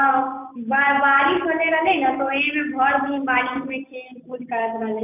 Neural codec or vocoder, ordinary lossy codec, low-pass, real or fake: none; none; 3.6 kHz; real